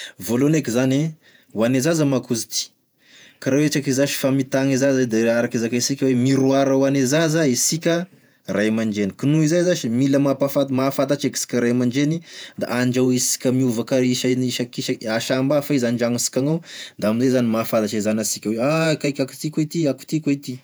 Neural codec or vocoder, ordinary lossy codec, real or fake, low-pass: vocoder, 48 kHz, 128 mel bands, Vocos; none; fake; none